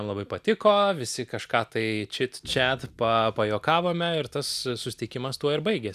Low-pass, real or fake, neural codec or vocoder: 14.4 kHz; real; none